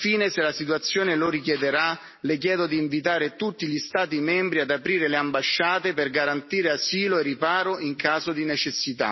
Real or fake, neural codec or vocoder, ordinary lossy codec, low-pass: real; none; MP3, 24 kbps; 7.2 kHz